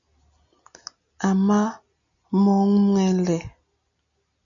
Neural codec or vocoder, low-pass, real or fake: none; 7.2 kHz; real